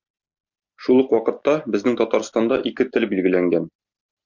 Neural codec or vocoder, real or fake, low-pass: none; real; 7.2 kHz